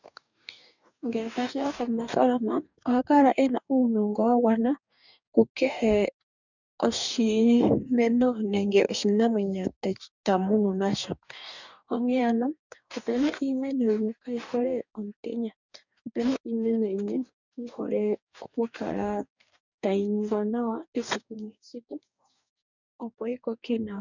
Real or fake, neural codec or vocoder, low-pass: fake; codec, 44.1 kHz, 2.6 kbps, DAC; 7.2 kHz